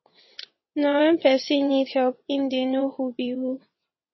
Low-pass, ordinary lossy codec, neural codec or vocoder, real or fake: 7.2 kHz; MP3, 24 kbps; vocoder, 22.05 kHz, 80 mel bands, WaveNeXt; fake